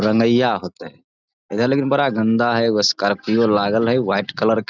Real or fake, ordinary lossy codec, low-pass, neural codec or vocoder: real; none; 7.2 kHz; none